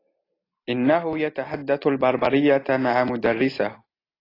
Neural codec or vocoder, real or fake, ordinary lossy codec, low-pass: none; real; AAC, 24 kbps; 5.4 kHz